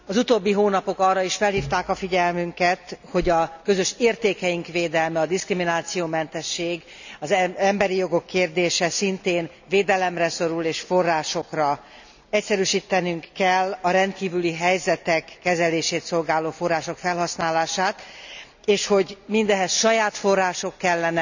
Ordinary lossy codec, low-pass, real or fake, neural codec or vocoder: none; 7.2 kHz; real; none